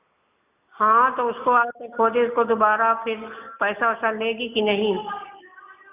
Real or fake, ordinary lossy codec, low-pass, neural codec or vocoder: real; none; 3.6 kHz; none